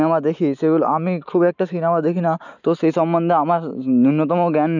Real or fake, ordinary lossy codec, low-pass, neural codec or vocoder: real; none; 7.2 kHz; none